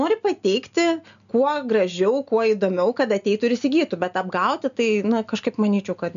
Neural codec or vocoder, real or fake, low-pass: none; real; 7.2 kHz